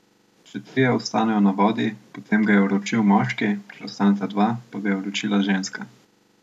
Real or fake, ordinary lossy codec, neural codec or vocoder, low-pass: real; none; none; 14.4 kHz